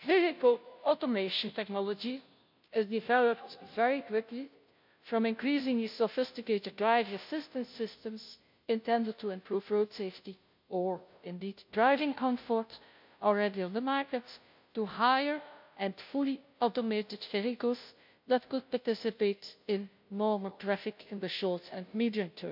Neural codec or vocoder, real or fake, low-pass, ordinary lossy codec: codec, 16 kHz, 0.5 kbps, FunCodec, trained on Chinese and English, 25 frames a second; fake; 5.4 kHz; MP3, 48 kbps